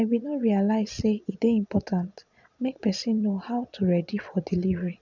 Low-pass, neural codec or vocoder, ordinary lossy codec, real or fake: 7.2 kHz; vocoder, 22.05 kHz, 80 mel bands, Vocos; none; fake